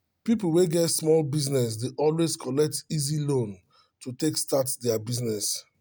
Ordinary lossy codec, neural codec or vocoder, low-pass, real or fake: none; none; none; real